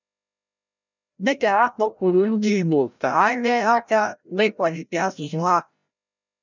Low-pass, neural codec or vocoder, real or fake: 7.2 kHz; codec, 16 kHz, 0.5 kbps, FreqCodec, larger model; fake